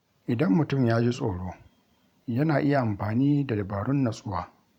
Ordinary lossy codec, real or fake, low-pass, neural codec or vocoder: none; fake; 19.8 kHz; vocoder, 44.1 kHz, 128 mel bands every 512 samples, BigVGAN v2